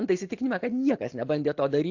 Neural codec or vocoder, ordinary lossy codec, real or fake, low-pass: none; AAC, 48 kbps; real; 7.2 kHz